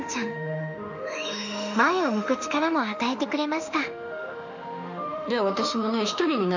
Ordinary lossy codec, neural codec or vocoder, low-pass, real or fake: none; autoencoder, 48 kHz, 32 numbers a frame, DAC-VAE, trained on Japanese speech; 7.2 kHz; fake